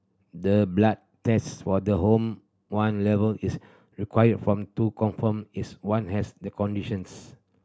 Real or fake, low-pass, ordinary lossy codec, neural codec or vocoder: real; none; none; none